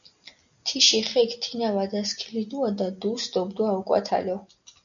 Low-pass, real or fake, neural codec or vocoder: 7.2 kHz; real; none